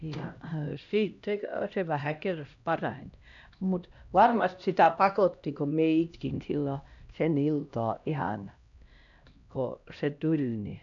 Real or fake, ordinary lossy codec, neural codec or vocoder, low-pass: fake; none; codec, 16 kHz, 1 kbps, X-Codec, HuBERT features, trained on LibriSpeech; 7.2 kHz